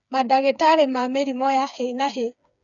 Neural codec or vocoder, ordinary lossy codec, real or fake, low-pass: codec, 16 kHz, 4 kbps, FreqCodec, smaller model; none; fake; 7.2 kHz